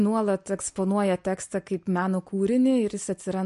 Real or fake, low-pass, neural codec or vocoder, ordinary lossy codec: real; 14.4 kHz; none; MP3, 48 kbps